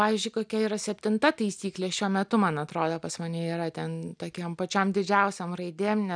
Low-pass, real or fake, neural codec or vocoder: 9.9 kHz; real; none